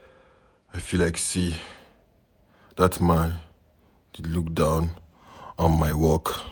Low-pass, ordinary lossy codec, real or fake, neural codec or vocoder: none; none; fake; vocoder, 48 kHz, 128 mel bands, Vocos